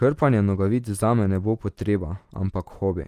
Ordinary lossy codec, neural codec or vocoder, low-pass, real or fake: Opus, 24 kbps; none; 14.4 kHz; real